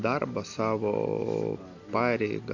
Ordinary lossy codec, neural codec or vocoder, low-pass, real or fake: AAC, 48 kbps; none; 7.2 kHz; real